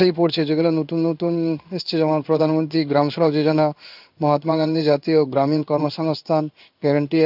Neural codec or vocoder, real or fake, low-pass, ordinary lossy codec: codec, 16 kHz in and 24 kHz out, 1 kbps, XY-Tokenizer; fake; 5.4 kHz; none